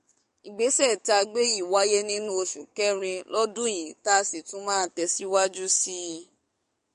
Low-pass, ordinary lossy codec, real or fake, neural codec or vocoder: 14.4 kHz; MP3, 48 kbps; fake; codec, 44.1 kHz, 7.8 kbps, DAC